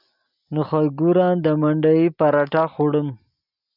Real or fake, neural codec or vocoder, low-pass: real; none; 5.4 kHz